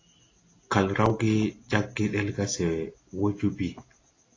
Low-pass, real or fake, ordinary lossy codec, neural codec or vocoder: 7.2 kHz; real; AAC, 32 kbps; none